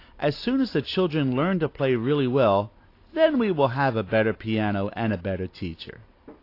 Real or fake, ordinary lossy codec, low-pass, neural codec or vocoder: real; AAC, 32 kbps; 5.4 kHz; none